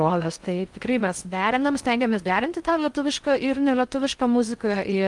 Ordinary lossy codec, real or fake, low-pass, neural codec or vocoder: Opus, 32 kbps; fake; 10.8 kHz; codec, 16 kHz in and 24 kHz out, 0.6 kbps, FocalCodec, streaming, 2048 codes